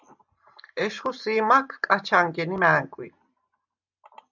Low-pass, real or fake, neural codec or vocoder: 7.2 kHz; real; none